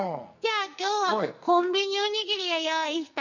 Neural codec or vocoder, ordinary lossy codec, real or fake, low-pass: codec, 44.1 kHz, 2.6 kbps, SNAC; none; fake; 7.2 kHz